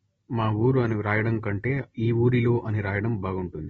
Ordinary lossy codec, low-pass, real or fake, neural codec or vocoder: AAC, 24 kbps; 19.8 kHz; real; none